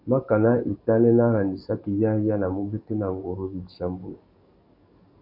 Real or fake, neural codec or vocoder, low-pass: fake; codec, 44.1 kHz, 7.8 kbps, DAC; 5.4 kHz